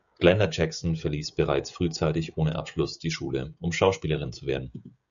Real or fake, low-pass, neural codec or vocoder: fake; 7.2 kHz; codec, 16 kHz, 16 kbps, FreqCodec, smaller model